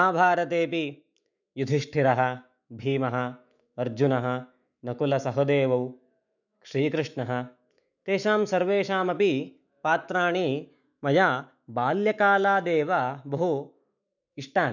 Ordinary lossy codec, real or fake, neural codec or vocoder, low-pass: none; fake; autoencoder, 48 kHz, 128 numbers a frame, DAC-VAE, trained on Japanese speech; 7.2 kHz